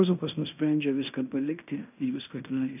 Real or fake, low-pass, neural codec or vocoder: fake; 3.6 kHz; codec, 16 kHz in and 24 kHz out, 0.9 kbps, LongCat-Audio-Codec, fine tuned four codebook decoder